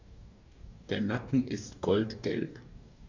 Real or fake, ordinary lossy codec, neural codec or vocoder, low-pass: fake; none; codec, 44.1 kHz, 2.6 kbps, DAC; 7.2 kHz